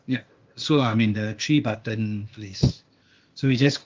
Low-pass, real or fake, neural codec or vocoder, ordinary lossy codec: 7.2 kHz; fake; codec, 16 kHz, 0.8 kbps, ZipCodec; Opus, 32 kbps